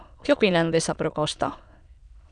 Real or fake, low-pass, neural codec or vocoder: fake; 9.9 kHz; autoencoder, 22.05 kHz, a latent of 192 numbers a frame, VITS, trained on many speakers